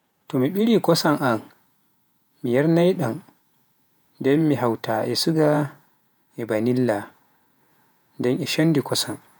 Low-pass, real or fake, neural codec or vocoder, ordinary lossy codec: none; fake; vocoder, 48 kHz, 128 mel bands, Vocos; none